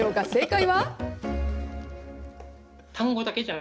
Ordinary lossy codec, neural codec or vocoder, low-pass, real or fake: none; none; none; real